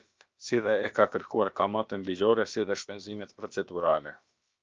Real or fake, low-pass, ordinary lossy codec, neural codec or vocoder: fake; 7.2 kHz; Opus, 24 kbps; codec, 16 kHz, about 1 kbps, DyCAST, with the encoder's durations